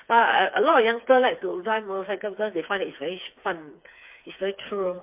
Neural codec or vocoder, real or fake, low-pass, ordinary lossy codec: codec, 16 kHz, 4 kbps, FreqCodec, smaller model; fake; 3.6 kHz; MP3, 32 kbps